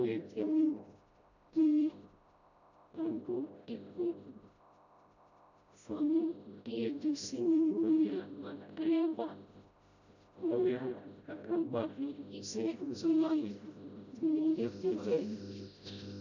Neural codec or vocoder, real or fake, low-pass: codec, 16 kHz, 0.5 kbps, FreqCodec, smaller model; fake; 7.2 kHz